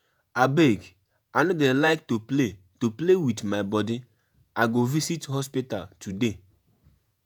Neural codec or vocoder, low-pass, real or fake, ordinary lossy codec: vocoder, 48 kHz, 128 mel bands, Vocos; none; fake; none